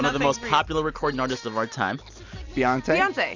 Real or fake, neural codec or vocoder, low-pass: real; none; 7.2 kHz